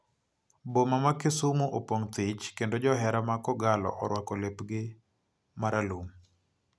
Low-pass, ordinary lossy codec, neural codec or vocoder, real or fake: none; none; none; real